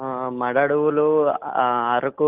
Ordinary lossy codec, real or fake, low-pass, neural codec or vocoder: Opus, 32 kbps; real; 3.6 kHz; none